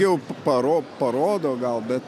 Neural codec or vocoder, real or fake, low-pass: none; real; 14.4 kHz